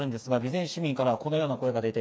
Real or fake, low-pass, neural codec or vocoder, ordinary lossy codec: fake; none; codec, 16 kHz, 4 kbps, FreqCodec, smaller model; none